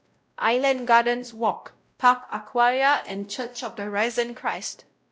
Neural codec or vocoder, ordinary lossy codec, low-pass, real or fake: codec, 16 kHz, 0.5 kbps, X-Codec, WavLM features, trained on Multilingual LibriSpeech; none; none; fake